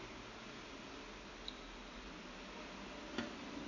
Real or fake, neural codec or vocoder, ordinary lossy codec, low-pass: real; none; none; 7.2 kHz